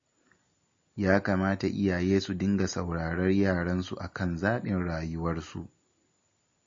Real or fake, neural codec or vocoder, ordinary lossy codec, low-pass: real; none; MP3, 32 kbps; 7.2 kHz